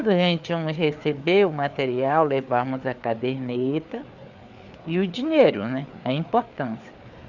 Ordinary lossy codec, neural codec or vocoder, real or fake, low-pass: none; codec, 16 kHz, 4 kbps, FreqCodec, larger model; fake; 7.2 kHz